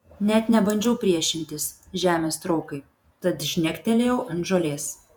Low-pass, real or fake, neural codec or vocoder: 19.8 kHz; fake; vocoder, 48 kHz, 128 mel bands, Vocos